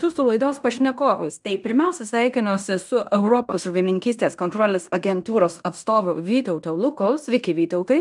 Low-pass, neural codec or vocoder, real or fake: 10.8 kHz; codec, 16 kHz in and 24 kHz out, 0.9 kbps, LongCat-Audio-Codec, fine tuned four codebook decoder; fake